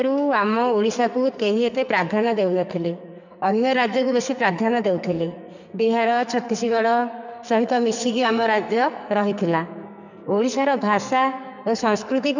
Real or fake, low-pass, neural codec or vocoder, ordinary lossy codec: fake; 7.2 kHz; codec, 44.1 kHz, 2.6 kbps, SNAC; none